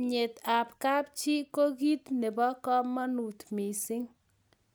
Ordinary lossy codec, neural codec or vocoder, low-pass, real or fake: none; none; none; real